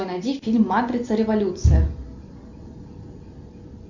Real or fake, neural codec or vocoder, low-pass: real; none; 7.2 kHz